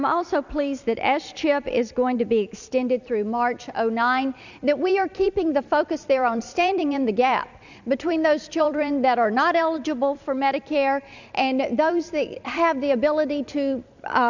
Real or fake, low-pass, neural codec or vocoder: fake; 7.2 kHz; vocoder, 44.1 kHz, 128 mel bands every 256 samples, BigVGAN v2